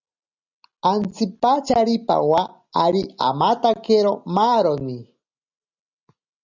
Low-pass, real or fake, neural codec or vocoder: 7.2 kHz; real; none